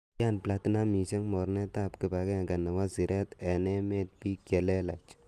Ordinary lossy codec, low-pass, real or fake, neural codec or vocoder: Opus, 32 kbps; 14.4 kHz; real; none